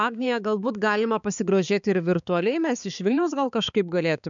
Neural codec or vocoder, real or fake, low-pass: codec, 16 kHz, 4 kbps, X-Codec, HuBERT features, trained on balanced general audio; fake; 7.2 kHz